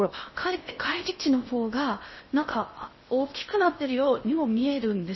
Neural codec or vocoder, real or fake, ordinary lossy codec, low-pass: codec, 16 kHz in and 24 kHz out, 0.6 kbps, FocalCodec, streaming, 4096 codes; fake; MP3, 24 kbps; 7.2 kHz